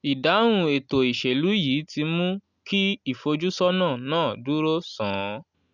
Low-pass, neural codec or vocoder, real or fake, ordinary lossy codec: 7.2 kHz; none; real; none